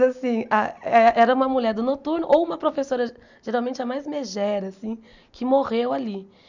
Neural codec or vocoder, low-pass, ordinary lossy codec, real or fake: none; 7.2 kHz; none; real